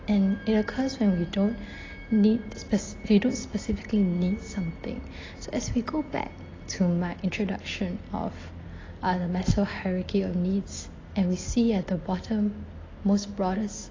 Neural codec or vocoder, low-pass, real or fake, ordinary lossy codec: none; 7.2 kHz; real; AAC, 32 kbps